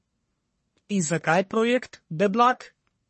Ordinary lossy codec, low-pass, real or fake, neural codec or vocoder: MP3, 32 kbps; 10.8 kHz; fake; codec, 44.1 kHz, 1.7 kbps, Pupu-Codec